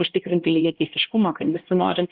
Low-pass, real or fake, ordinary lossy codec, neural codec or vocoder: 5.4 kHz; fake; Opus, 16 kbps; codec, 16 kHz, 1 kbps, X-Codec, HuBERT features, trained on LibriSpeech